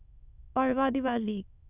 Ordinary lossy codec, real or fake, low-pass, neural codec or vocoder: none; fake; 3.6 kHz; autoencoder, 22.05 kHz, a latent of 192 numbers a frame, VITS, trained on many speakers